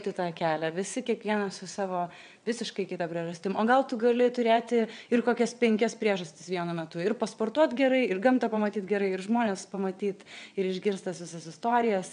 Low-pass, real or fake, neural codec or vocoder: 9.9 kHz; fake; vocoder, 22.05 kHz, 80 mel bands, WaveNeXt